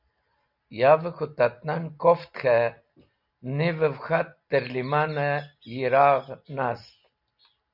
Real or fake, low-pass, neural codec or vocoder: real; 5.4 kHz; none